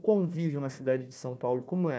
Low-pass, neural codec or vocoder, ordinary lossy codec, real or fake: none; codec, 16 kHz, 1 kbps, FunCodec, trained on Chinese and English, 50 frames a second; none; fake